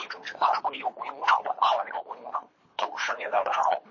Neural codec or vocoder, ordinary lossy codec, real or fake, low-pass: codec, 24 kHz, 3 kbps, HILCodec; MP3, 32 kbps; fake; 7.2 kHz